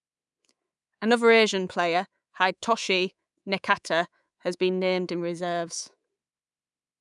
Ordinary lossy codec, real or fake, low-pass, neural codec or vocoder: none; fake; 10.8 kHz; codec, 24 kHz, 3.1 kbps, DualCodec